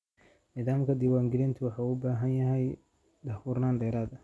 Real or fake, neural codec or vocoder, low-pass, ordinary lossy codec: real; none; none; none